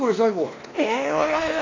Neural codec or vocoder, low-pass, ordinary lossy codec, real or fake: codec, 16 kHz, 1 kbps, X-Codec, WavLM features, trained on Multilingual LibriSpeech; 7.2 kHz; AAC, 32 kbps; fake